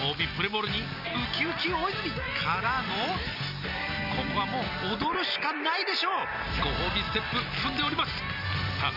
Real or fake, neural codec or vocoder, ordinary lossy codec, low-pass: real; none; none; 5.4 kHz